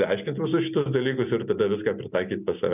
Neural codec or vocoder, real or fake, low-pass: none; real; 3.6 kHz